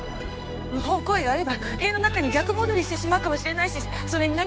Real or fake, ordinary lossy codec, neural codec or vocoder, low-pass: fake; none; codec, 16 kHz, 4 kbps, X-Codec, HuBERT features, trained on general audio; none